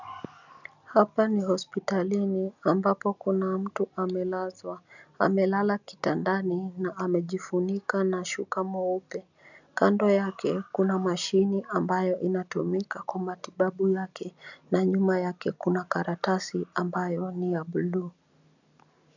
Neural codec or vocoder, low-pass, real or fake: none; 7.2 kHz; real